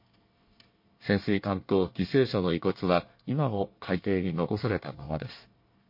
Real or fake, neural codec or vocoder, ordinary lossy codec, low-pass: fake; codec, 24 kHz, 1 kbps, SNAC; MP3, 32 kbps; 5.4 kHz